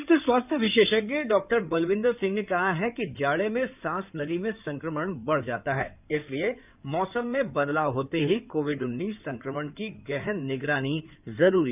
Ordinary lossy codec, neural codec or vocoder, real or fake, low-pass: none; codec, 16 kHz in and 24 kHz out, 2.2 kbps, FireRedTTS-2 codec; fake; 3.6 kHz